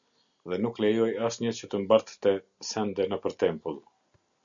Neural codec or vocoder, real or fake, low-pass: none; real; 7.2 kHz